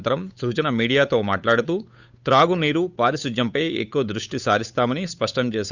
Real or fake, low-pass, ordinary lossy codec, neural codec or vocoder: fake; 7.2 kHz; none; codec, 16 kHz, 8 kbps, FunCodec, trained on Chinese and English, 25 frames a second